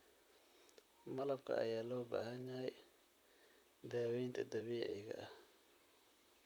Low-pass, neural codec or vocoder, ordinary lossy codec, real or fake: none; vocoder, 44.1 kHz, 128 mel bands, Pupu-Vocoder; none; fake